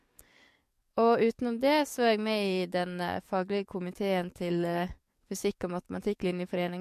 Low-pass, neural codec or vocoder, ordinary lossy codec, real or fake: 14.4 kHz; codec, 44.1 kHz, 7.8 kbps, DAC; MP3, 64 kbps; fake